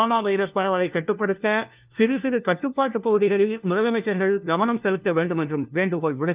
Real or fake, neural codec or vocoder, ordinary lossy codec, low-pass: fake; codec, 16 kHz, 1 kbps, FunCodec, trained on LibriTTS, 50 frames a second; Opus, 24 kbps; 3.6 kHz